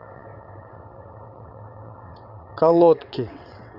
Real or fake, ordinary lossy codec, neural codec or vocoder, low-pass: fake; none; vocoder, 22.05 kHz, 80 mel bands, WaveNeXt; 5.4 kHz